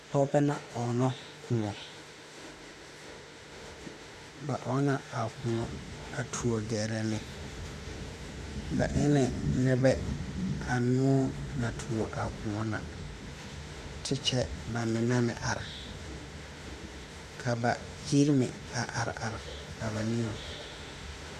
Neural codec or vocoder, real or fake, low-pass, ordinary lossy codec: autoencoder, 48 kHz, 32 numbers a frame, DAC-VAE, trained on Japanese speech; fake; 14.4 kHz; Opus, 64 kbps